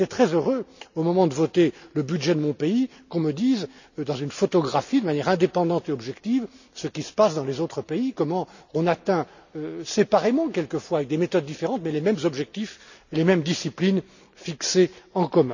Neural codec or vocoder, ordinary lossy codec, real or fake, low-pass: none; none; real; 7.2 kHz